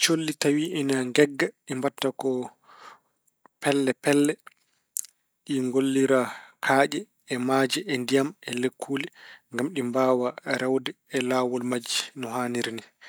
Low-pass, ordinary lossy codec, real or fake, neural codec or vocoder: none; none; real; none